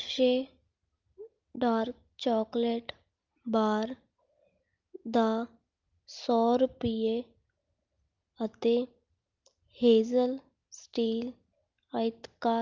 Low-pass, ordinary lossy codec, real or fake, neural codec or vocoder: 7.2 kHz; Opus, 24 kbps; real; none